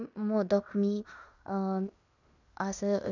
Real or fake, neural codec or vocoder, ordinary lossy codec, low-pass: fake; codec, 16 kHz in and 24 kHz out, 0.9 kbps, LongCat-Audio-Codec, fine tuned four codebook decoder; none; 7.2 kHz